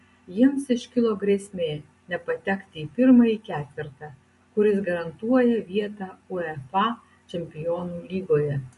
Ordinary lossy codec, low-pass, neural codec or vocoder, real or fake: MP3, 48 kbps; 14.4 kHz; none; real